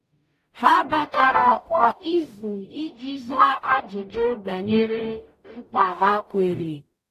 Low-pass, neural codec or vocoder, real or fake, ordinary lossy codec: 14.4 kHz; codec, 44.1 kHz, 0.9 kbps, DAC; fake; AAC, 64 kbps